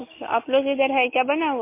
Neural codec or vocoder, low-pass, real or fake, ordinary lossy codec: none; 3.6 kHz; real; MP3, 24 kbps